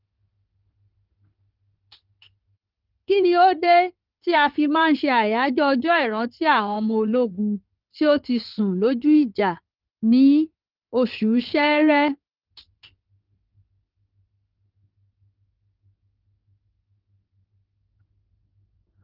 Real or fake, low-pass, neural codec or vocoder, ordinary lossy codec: fake; 5.4 kHz; codec, 16 kHz in and 24 kHz out, 2.2 kbps, FireRedTTS-2 codec; Opus, 24 kbps